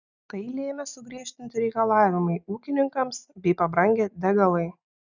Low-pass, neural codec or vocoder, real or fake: 7.2 kHz; none; real